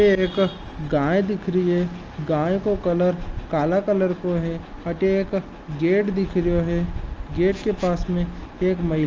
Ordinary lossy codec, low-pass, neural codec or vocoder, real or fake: Opus, 24 kbps; 7.2 kHz; none; real